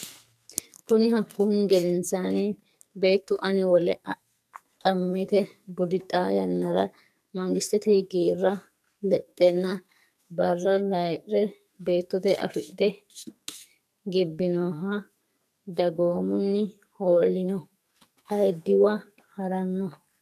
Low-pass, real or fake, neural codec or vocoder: 14.4 kHz; fake; codec, 44.1 kHz, 2.6 kbps, SNAC